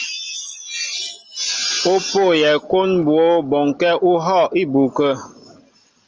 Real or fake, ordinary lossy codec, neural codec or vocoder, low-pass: real; Opus, 24 kbps; none; 7.2 kHz